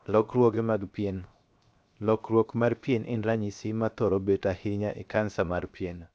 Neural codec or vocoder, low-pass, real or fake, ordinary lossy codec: codec, 16 kHz, 0.7 kbps, FocalCodec; none; fake; none